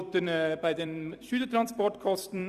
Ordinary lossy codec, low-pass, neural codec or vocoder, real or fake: Opus, 64 kbps; 14.4 kHz; vocoder, 44.1 kHz, 128 mel bands every 256 samples, BigVGAN v2; fake